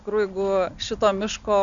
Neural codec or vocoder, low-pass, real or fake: none; 7.2 kHz; real